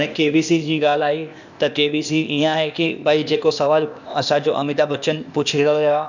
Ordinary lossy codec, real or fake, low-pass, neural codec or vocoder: none; fake; 7.2 kHz; codec, 16 kHz, 0.8 kbps, ZipCodec